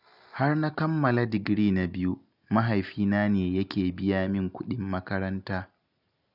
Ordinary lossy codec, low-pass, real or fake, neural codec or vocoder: none; 5.4 kHz; real; none